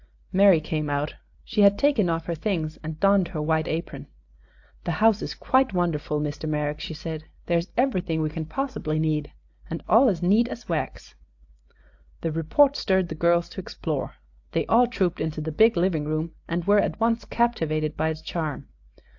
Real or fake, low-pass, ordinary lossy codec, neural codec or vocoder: real; 7.2 kHz; AAC, 48 kbps; none